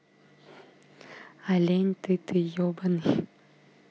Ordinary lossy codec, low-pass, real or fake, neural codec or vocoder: none; none; real; none